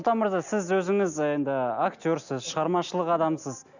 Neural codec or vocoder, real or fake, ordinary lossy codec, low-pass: none; real; none; 7.2 kHz